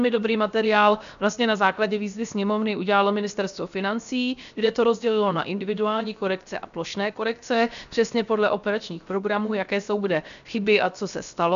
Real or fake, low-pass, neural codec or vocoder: fake; 7.2 kHz; codec, 16 kHz, 0.7 kbps, FocalCodec